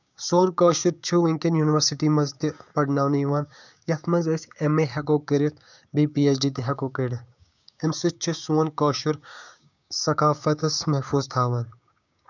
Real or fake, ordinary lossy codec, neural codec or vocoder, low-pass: fake; none; codec, 16 kHz, 6 kbps, DAC; 7.2 kHz